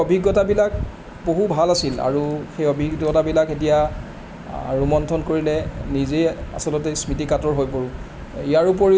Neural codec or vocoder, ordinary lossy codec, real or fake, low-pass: none; none; real; none